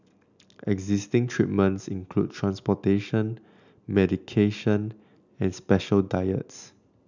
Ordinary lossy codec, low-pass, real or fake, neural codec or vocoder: none; 7.2 kHz; real; none